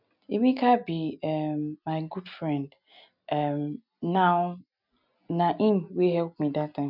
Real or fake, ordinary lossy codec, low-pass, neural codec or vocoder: real; none; 5.4 kHz; none